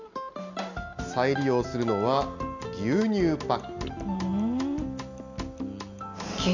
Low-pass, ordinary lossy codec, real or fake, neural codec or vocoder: 7.2 kHz; none; real; none